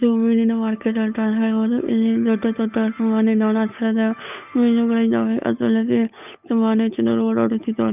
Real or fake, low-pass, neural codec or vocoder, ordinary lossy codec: fake; 3.6 kHz; codec, 16 kHz, 8 kbps, FunCodec, trained on Chinese and English, 25 frames a second; none